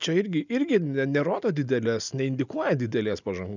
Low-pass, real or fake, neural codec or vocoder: 7.2 kHz; real; none